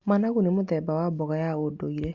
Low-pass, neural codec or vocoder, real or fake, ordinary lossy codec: 7.2 kHz; none; real; Opus, 64 kbps